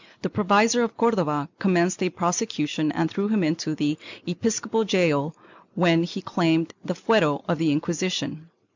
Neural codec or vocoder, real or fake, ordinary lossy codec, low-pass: none; real; MP3, 64 kbps; 7.2 kHz